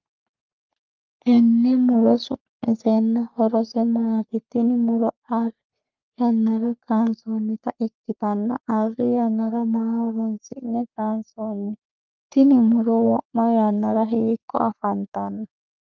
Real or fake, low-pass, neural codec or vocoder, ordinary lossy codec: fake; 7.2 kHz; codec, 44.1 kHz, 3.4 kbps, Pupu-Codec; Opus, 24 kbps